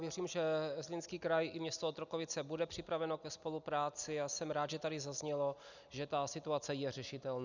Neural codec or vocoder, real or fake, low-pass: none; real; 7.2 kHz